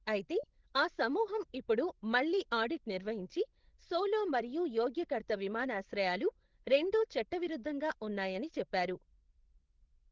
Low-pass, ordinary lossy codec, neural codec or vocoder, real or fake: 7.2 kHz; Opus, 16 kbps; codec, 16 kHz, 16 kbps, FreqCodec, larger model; fake